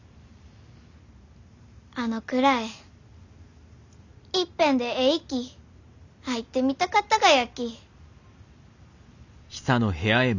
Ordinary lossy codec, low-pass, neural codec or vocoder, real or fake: none; 7.2 kHz; none; real